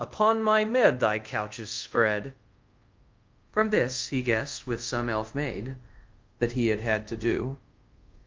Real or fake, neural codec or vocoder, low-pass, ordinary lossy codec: fake; codec, 24 kHz, 0.5 kbps, DualCodec; 7.2 kHz; Opus, 24 kbps